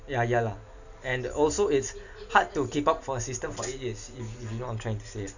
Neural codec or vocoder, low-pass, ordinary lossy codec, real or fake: none; 7.2 kHz; none; real